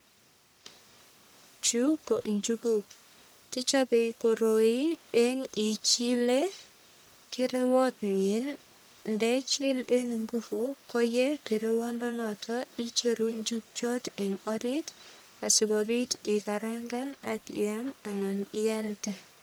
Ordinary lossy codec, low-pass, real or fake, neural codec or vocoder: none; none; fake; codec, 44.1 kHz, 1.7 kbps, Pupu-Codec